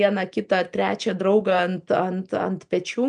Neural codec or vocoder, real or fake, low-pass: none; real; 9.9 kHz